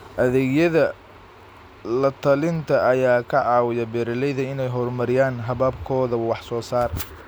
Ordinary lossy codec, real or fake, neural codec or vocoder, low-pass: none; real; none; none